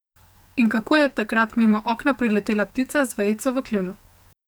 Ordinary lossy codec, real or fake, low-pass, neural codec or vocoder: none; fake; none; codec, 44.1 kHz, 2.6 kbps, SNAC